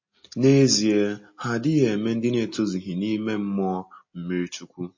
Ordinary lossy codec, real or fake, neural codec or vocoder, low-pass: MP3, 32 kbps; real; none; 7.2 kHz